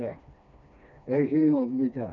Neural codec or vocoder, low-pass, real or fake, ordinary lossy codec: codec, 16 kHz, 2 kbps, FreqCodec, smaller model; 7.2 kHz; fake; none